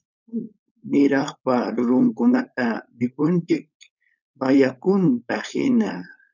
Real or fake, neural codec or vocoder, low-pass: fake; codec, 16 kHz, 4.8 kbps, FACodec; 7.2 kHz